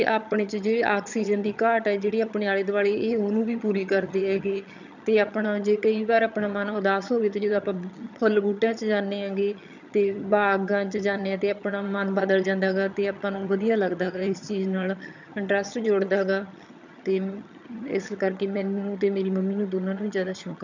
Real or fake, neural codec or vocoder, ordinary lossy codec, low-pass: fake; vocoder, 22.05 kHz, 80 mel bands, HiFi-GAN; none; 7.2 kHz